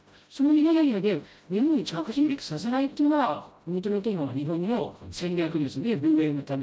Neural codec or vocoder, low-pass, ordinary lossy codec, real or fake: codec, 16 kHz, 0.5 kbps, FreqCodec, smaller model; none; none; fake